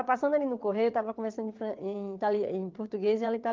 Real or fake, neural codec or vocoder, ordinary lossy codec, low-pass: fake; vocoder, 22.05 kHz, 80 mel bands, WaveNeXt; Opus, 32 kbps; 7.2 kHz